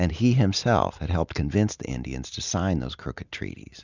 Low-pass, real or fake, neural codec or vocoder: 7.2 kHz; real; none